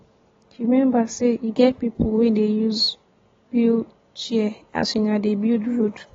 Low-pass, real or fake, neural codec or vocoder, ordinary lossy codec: 7.2 kHz; real; none; AAC, 24 kbps